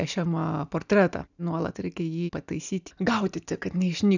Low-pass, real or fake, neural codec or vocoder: 7.2 kHz; real; none